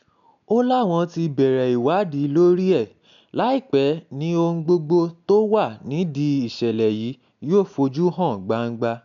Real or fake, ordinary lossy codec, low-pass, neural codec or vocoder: real; none; 7.2 kHz; none